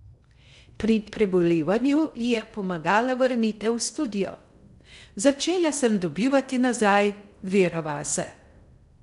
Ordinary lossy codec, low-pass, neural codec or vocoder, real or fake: none; 10.8 kHz; codec, 16 kHz in and 24 kHz out, 0.6 kbps, FocalCodec, streaming, 2048 codes; fake